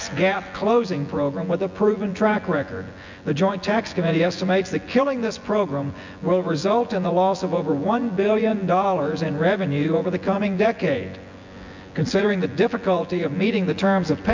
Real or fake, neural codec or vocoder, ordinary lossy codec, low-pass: fake; vocoder, 24 kHz, 100 mel bands, Vocos; MP3, 64 kbps; 7.2 kHz